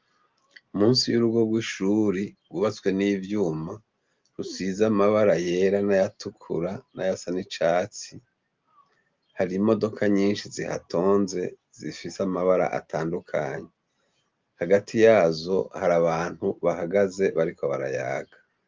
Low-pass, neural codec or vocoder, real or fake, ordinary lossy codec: 7.2 kHz; none; real; Opus, 32 kbps